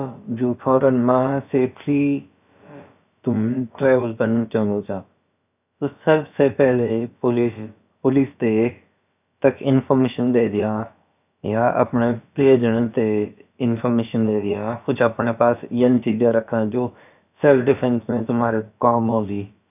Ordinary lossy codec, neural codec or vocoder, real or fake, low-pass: none; codec, 16 kHz, about 1 kbps, DyCAST, with the encoder's durations; fake; 3.6 kHz